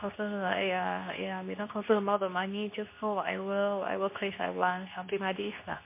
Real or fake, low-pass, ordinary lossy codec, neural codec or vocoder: fake; 3.6 kHz; MP3, 24 kbps; codec, 24 kHz, 0.9 kbps, WavTokenizer, medium speech release version 2